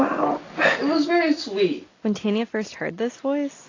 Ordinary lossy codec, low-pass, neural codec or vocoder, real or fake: AAC, 32 kbps; 7.2 kHz; none; real